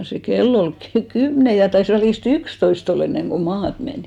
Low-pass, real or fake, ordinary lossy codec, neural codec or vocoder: 19.8 kHz; real; none; none